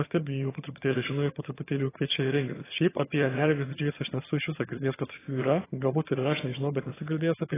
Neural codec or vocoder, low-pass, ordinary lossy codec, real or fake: vocoder, 22.05 kHz, 80 mel bands, HiFi-GAN; 3.6 kHz; AAC, 16 kbps; fake